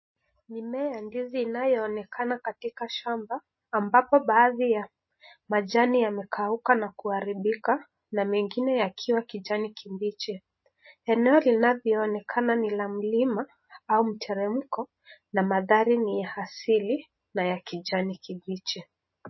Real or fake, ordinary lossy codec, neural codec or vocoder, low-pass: real; MP3, 24 kbps; none; 7.2 kHz